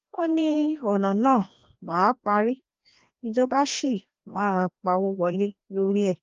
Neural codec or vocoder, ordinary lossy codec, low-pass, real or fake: codec, 16 kHz, 1 kbps, FreqCodec, larger model; Opus, 32 kbps; 7.2 kHz; fake